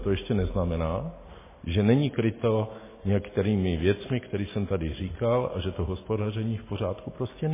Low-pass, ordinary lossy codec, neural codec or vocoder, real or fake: 3.6 kHz; MP3, 16 kbps; none; real